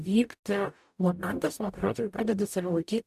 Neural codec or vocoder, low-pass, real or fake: codec, 44.1 kHz, 0.9 kbps, DAC; 14.4 kHz; fake